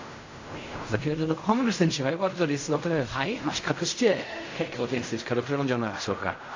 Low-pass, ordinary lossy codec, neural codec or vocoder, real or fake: 7.2 kHz; AAC, 48 kbps; codec, 16 kHz in and 24 kHz out, 0.4 kbps, LongCat-Audio-Codec, fine tuned four codebook decoder; fake